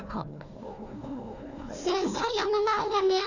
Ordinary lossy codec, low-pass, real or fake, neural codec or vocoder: none; 7.2 kHz; fake; codec, 16 kHz, 1 kbps, FunCodec, trained on Chinese and English, 50 frames a second